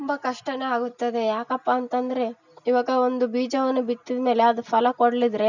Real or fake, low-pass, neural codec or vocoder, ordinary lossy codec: real; 7.2 kHz; none; none